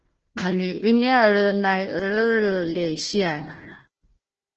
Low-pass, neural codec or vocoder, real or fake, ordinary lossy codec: 7.2 kHz; codec, 16 kHz, 1 kbps, FunCodec, trained on Chinese and English, 50 frames a second; fake; Opus, 16 kbps